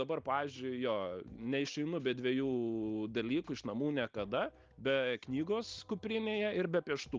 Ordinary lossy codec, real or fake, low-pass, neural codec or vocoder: Opus, 24 kbps; real; 7.2 kHz; none